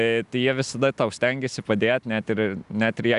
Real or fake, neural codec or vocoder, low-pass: real; none; 10.8 kHz